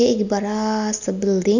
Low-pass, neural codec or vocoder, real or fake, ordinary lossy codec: 7.2 kHz; none; real; none